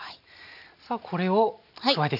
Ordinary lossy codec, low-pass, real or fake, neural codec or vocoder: none; 5.4 kHz; real; none